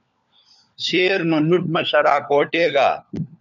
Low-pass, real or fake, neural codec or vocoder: 7.2 kHz; fake; codec, 16 kHz, 4 kbps, FunCodec, trained on LibriTTS, 50 frames a second